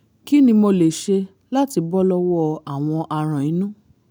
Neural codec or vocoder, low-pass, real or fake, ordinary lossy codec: none; none; real; none